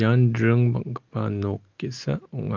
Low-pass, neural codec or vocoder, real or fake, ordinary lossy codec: 7.2 kHz; none; real; Opus, 32 kbps